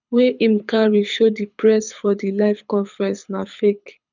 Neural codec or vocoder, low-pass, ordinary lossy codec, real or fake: codec, 24 kHz, 6 kbps, HILCodec; 7.2 kHz; none; fake